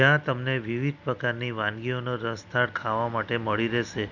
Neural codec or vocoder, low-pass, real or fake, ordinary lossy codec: none; 7.2 kHz; real; none